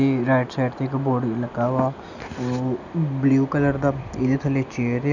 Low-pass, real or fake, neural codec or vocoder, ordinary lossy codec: 7.2 kHz; real; none; none